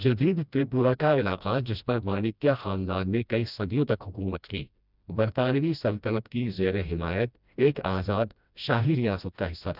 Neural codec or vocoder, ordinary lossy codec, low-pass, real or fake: codec, 16 kHz, 1 kbps, FreqCodec, smaller model; none; 5.4 kHz; fake